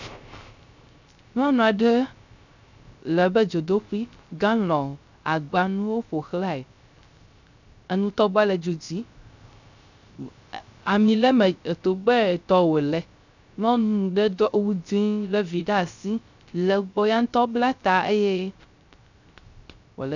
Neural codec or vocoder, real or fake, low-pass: codec, 16 kHz, 0.3 kbps, FocalCodec; fake; 7.2 kHz